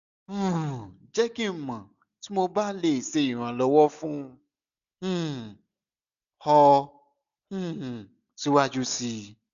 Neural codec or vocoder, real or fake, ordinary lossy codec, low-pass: none; real; none; 7.2 kHz